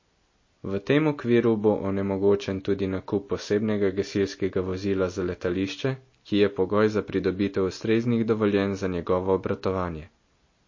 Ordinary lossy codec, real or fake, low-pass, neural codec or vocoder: MP3, 32 kbps; real; 7.2 kHz; none